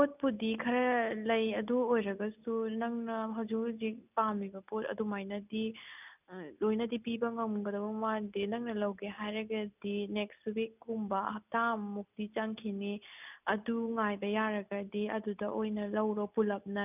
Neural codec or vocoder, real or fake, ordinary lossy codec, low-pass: none; real; none; 3.6 kHz